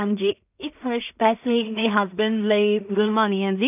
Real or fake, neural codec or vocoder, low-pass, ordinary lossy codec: fake; codec, 16 kHz in and 24 kHz out, 0.4 kbps, LongCat-Audio-Codec, two codebook decoder; 3.6 kHz; none